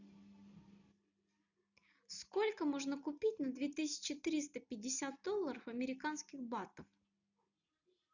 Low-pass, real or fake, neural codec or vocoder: 7.2 kHz; real; none